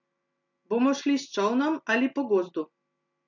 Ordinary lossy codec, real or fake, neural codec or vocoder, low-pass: none; real; none; 7.2 kHz